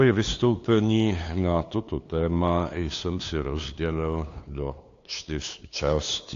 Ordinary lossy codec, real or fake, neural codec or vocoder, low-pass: AAC, 48 kbps; fake; codec, 16 kHz, 2 kbps, FunCodec, trained on LibriTTS, 25 frames a second; 7.2 kHz